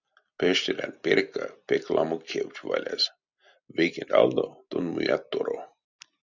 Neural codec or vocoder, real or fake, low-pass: none; real; 7.2 kHz